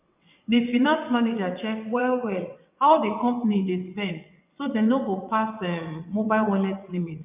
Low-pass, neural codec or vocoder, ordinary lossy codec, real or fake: 3.6 kHz; vocoder, 22.05 kHz, 80 mel bands, WaveNeXt; none; fake